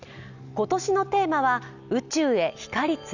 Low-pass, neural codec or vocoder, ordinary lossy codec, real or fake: 7.2 kHz; none; none; real